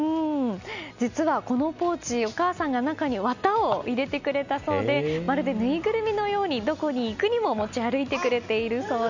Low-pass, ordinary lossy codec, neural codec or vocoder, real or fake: 7.2 kHz; none; none; real